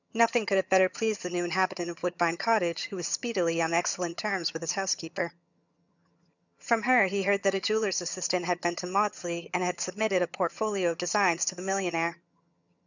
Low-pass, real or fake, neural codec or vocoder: 7.2 kHz; fake; vocoder, 22.05 kHz, 80 mel bands, HiFi-GAN